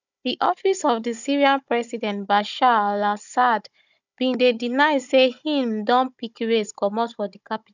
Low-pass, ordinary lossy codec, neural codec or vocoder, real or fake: 7.2 kHz; none; codec, 16 kHz, 16 kbps, FunCodec, trained on Chinese and English, 50 frames a second; fake